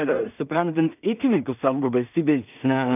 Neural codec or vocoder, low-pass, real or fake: codec, 16 kHz in and 24 kHz out, 0.4 kbps, LongCat-Audio-Codec, two codebook decoder; 3.6 kHz; fake